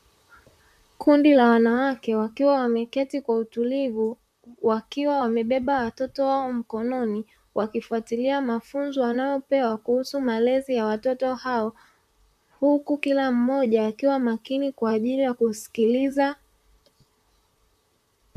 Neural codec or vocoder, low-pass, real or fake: vocoder, 44.1 kHz, 128 mel bands, Pupu-Vocoder; 14.4 kHz; fake